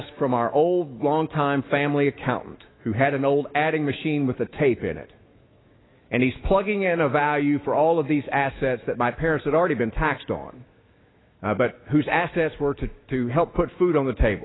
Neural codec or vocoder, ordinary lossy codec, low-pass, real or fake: autoencoder, 48 kHz, 128 numbers a frame, DAC-VAE, trained on Japanese speech; AAC, 16 kbps; 7.2 kHz; fake